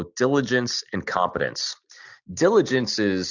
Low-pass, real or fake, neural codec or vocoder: 7.2 kHz; real; none